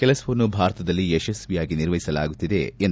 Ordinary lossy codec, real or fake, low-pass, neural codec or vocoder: none; real; none; none